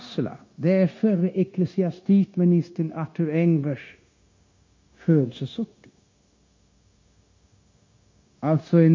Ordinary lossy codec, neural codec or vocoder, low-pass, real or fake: MP3, 32 kbps; codec, 16 kHz, 0.9 kbps, LongCat-Audio-Codec; 7.2 kHz; fake